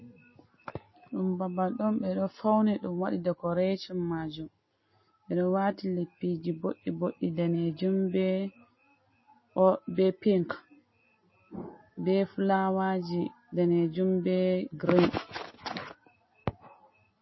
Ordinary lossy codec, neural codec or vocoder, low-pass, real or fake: MP3, 24 kbps; none; 7.2 kHz; real